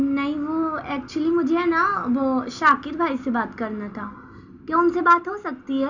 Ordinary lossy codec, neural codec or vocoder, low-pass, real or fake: none; none; 7.2 kHz; real